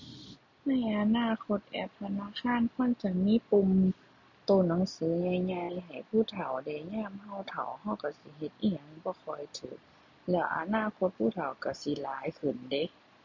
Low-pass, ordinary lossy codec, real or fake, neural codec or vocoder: 7.2 kHz; MP3, 64 kbps; real; none